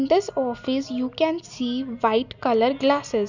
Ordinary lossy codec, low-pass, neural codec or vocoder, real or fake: none; 7.2 kHz; none; real